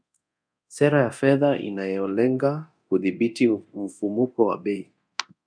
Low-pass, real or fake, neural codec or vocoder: 9.9 kHz; fake; codec, 24 kHz, 0.9 kbps, DualCodec